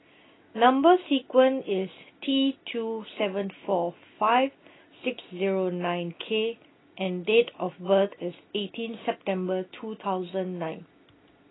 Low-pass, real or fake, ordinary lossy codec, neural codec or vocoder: 7.2 kHz; real; AAC, 16 kbps; none